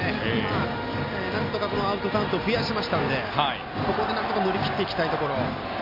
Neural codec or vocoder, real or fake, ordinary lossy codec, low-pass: none; real; MP3, 32 kbps; 5.4 kHz